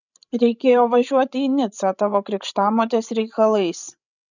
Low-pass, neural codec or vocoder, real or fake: 7.2 kHz; codec, 16 kHz, 16 kbps, FreqCodec, larger model; fake